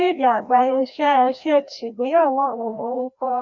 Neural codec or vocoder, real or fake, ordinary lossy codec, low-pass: codec, 16 kHz, 1 kbps, FreqCodec, larger model; fake; none; 7.2 kHz